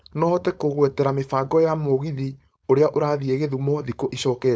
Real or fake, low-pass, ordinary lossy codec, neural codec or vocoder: fake; none; none; codec, 16 kHz, 4.8 kbps, FACodec